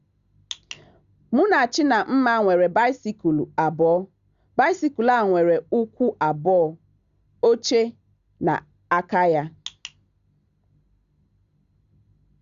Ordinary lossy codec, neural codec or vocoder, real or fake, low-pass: Opus, 64 kbps; none; real; 7.2 kHz